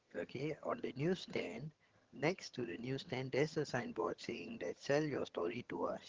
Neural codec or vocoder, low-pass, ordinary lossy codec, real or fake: vocoder, 22.05 kHz, 80 mel bands, HiFi-GAN; 7.2 kHz; Opus, 16 kbps; fake